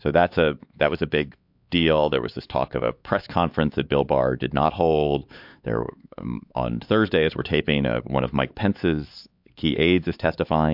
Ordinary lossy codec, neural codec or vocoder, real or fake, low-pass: MP3, 48 kbps; codec, 16 kHz, 4 kbps, X-Codec, HuBERT features, trained on LibriSpeech; fake; 5.4 kHz